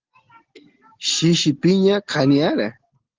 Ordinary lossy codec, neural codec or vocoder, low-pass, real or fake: Opus, 16 kbps; none; 7.2 kHz; real